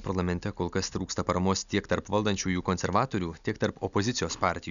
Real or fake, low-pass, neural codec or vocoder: real; 7.2 kHz; none